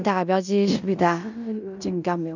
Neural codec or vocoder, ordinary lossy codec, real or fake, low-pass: codec, 16 kHz in and 24 kHz out, 0.9 kbps, LongCat-Audio-Codec, fine tuned four codebook decoder; none; fake; 7.2 kHz